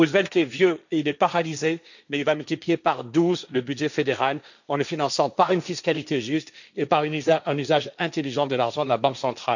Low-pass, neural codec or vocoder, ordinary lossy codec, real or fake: 7.2 kHz; codec, 16 kHz, 1.1 kbps, Voila-Tokenizer; none; fake